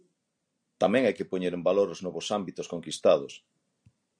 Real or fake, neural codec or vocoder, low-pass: real; none; 9.9 kHz